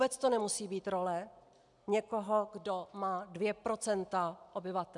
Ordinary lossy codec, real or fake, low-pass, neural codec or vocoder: MP3, 96 kbps; real; 10.8 kHz; none